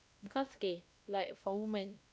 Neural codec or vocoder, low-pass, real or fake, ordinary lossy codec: codec, 16 kHz, 1 kbps, X-Codec, WavLM features, trained on Multilingual LibriSpeech; none; fake; none